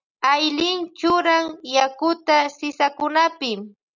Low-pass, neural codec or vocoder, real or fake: 7.2 kHz; none; real